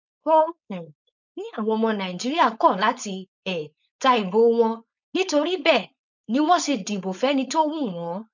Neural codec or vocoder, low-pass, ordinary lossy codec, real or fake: codec, 16 kHz, 4.8 kbps, FACodec; 7.2 kHz; none; fake